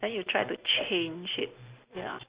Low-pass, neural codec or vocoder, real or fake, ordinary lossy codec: 3.6 kHz; none; real; Opus, 64 kbps